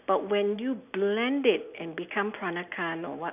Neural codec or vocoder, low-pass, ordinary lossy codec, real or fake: none; 3.6 kHz; none; real